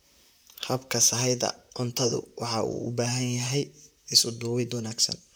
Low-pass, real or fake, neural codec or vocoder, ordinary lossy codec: none; fake; vocoder, 44.1 kHz, 128 mel bands, Pupu-Vocoder; none